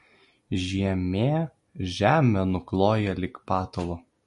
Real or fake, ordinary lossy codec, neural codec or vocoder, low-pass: real; MP3, 48 kbps; none; 14.4 kHz